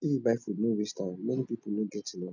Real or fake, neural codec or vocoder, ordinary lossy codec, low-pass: real; none; none; 7.2 kHz